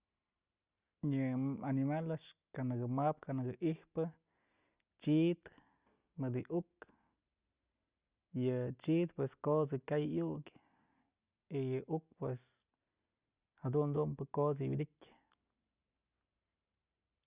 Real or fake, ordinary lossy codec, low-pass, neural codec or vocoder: real; Opus, 64 kbps; 3.6 kHz; none